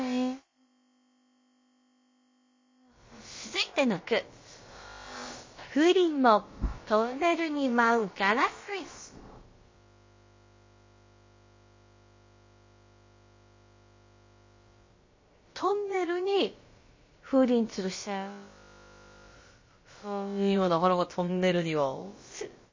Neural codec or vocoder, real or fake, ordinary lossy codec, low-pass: codec, 16 kHz, about 1 kbps, DyCAST, with the encoder's durations; fake; MP3, 32 kbps; 7.2 kHz